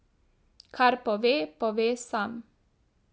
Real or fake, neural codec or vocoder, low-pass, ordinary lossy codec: real; none; none; none